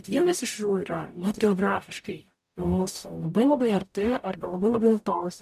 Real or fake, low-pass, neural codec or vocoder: fake; 14.4 kHz; codec, 44.1 kHz, 0.9 kbps, DAC